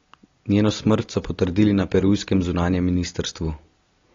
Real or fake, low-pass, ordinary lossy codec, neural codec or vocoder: real; 7.2 kHz; AAC, 32 kbps; none